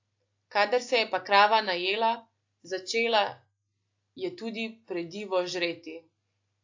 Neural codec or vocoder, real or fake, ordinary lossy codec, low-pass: none; real; AAC, 48 kbps; 7.2 kHz